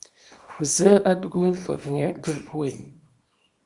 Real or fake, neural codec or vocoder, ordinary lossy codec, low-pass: fake; codec, 24 kHz, 0.9 kbps, WavTokenizer, small release; Opus, 64 kbps; 10.8 kHz